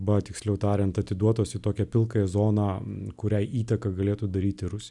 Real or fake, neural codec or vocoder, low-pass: real; none; 10.8 kHz